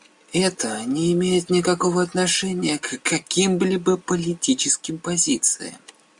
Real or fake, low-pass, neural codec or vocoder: real; 10.8 kHz; none